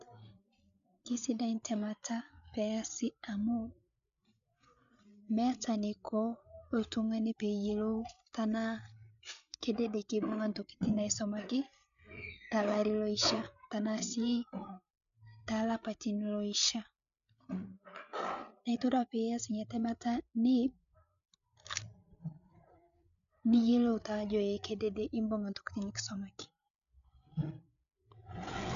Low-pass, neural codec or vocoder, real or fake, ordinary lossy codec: 7.2 kHz; codec, 16 kHz, 8 kbps, FreqCodec, larger model; fake; none